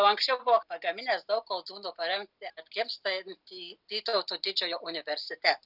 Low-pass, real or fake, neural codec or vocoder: 5.4 kHz; real; none